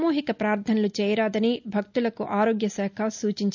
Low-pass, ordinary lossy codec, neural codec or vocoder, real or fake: 7.2 kHz; none; none; real